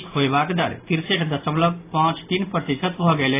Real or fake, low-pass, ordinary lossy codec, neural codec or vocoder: real; 3.6 kHz; AAC, 24 kbps; none